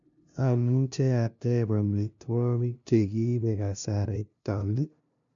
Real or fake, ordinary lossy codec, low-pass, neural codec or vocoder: fake; none; 7.2 kHz; codec, 16 kHz, 0.5 kbps, FunCodec, trained on LibriTTS, 25 frames a second